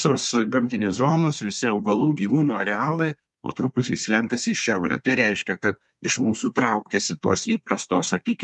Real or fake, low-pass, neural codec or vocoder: fake; 10.8 kHz; codec, 24 kHz, 1 kbps, SNAC